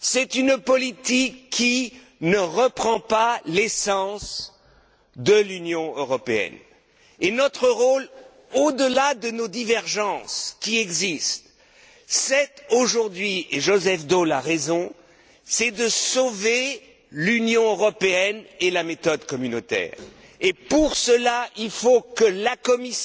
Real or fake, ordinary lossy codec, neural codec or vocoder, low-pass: real; none; none; none